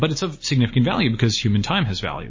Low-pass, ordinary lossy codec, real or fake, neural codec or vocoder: 7.2 kHz; MP3, 32 kbps; real; none